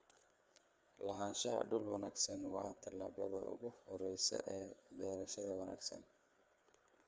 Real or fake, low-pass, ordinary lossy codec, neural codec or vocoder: fake; none; none; codec, 16 kHz, 4 kbps, FreqCodec, larger model